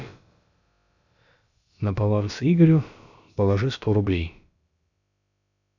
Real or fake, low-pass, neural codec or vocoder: fake; 7.2 kHz; codec, 16 kHz, about 1 kbps, DyCAST, with the encoder's durations